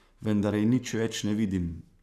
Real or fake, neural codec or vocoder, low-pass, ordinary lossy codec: fake; codec, 44.1 kHz, 7.8 kbps, Pupu-Codec; 14.4 kHz; none